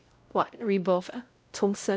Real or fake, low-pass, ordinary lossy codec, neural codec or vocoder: fake; none; none; codec, 16 kHz, 0.5 kbps, X-Codec, WavLM features, trained on Multilingual LibriSpeech